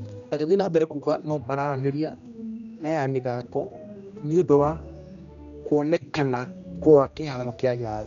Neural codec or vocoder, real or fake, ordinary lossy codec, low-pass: codec, 16 kHz, 1 kbps, X-Codec, HuBERT features, trained on general audio; fake; none; 7.2 kHz